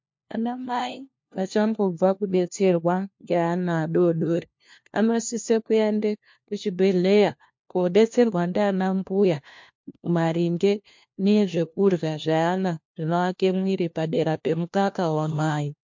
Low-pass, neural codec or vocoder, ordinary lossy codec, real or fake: 7.2 kHz; codec, 16 kHz, 1 kbps, FunCodec, trained on LibriTTS, 50 frames a second; MP3, 48 kbps; fake